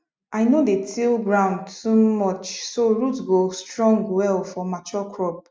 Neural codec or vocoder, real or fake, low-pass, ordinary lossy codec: none; real; none; none